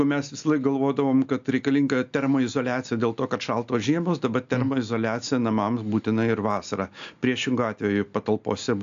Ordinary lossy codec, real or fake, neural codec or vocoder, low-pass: AAC, 64 kbps; real; none; 7.2 kHz